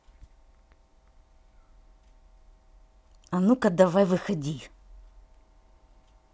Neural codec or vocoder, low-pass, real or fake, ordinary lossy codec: none; none; real; none